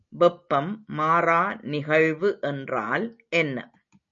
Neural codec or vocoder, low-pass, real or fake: none; 7.2 kHz; real